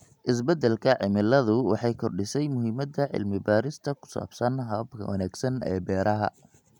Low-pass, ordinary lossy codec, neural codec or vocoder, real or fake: 19.8 kHz; none; none; real